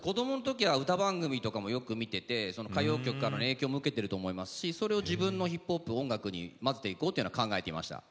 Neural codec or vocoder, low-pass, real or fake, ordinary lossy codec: none; none; real; none